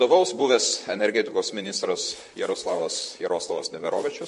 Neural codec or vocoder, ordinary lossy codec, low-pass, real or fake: vocoder, 44.1 kHz, 128 mel bands, Pupu-Vocoder; MP3, 48 kbps; 14.4 kHz; fake